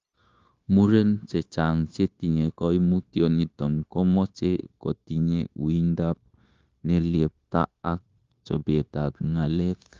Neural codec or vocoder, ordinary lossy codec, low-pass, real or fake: codec, 16 kHz, 0.9 kbps, LongCat-Audio-Codec; Opus, 32 kbps; 7.2 kHz; fake